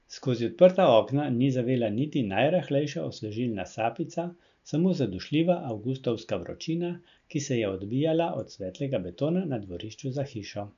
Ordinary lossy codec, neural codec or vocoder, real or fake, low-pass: none; none; real; 7.2 kHz